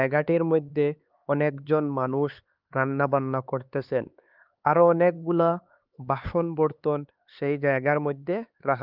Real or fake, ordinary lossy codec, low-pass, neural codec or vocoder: fake; Opus, 24 kbps; 5.4 kHz; codec, 16 kHz, 4 kbps, X-Codec, HuBERT features, trained on LibriSpeech